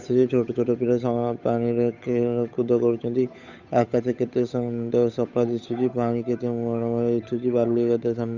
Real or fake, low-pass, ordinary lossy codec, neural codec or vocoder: fake; 7.2 kHz; none; codec, 16 kHz, 8 kbps, FunCodec, trained on Chinese and English, 25 frames a second